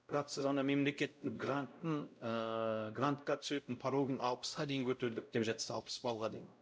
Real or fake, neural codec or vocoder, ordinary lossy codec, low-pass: fake; codec, 16 kHz, 0.5 kbps, X-Codec, WavLM features, trained on Multilingual LibriSpeech; none; none